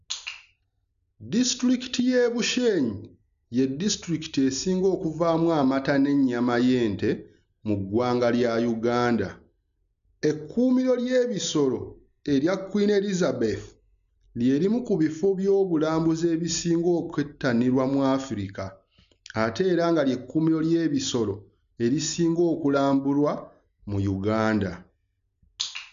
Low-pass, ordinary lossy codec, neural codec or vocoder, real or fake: 7.2 kHz; none; none; real